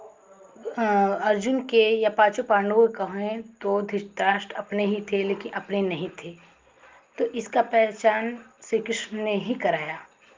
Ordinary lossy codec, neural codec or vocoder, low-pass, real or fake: Opus, 32 kbps; none; 7.2 kHz; real